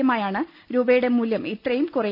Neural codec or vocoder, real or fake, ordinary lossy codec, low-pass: none; real; none; 5.4 kHz